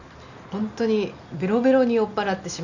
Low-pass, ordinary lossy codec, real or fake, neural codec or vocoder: 7.2 kHz; none; real; none